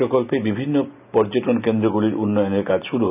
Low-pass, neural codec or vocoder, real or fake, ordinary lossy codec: 3.6 kHz; none; real; none